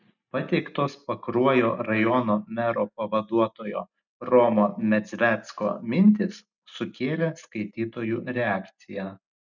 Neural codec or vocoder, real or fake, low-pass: none; real; 7.2 kHz